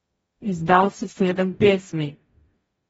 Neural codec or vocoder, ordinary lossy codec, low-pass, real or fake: codec, 44.1 kHz, 0.9 kbps, DAC; AAC, 24 kbps; 19.8 kHz; fake